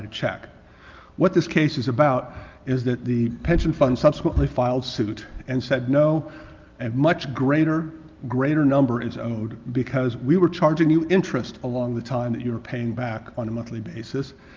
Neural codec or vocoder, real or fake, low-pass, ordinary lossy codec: none; real; 7.2 kHz; Opus, 24 kbps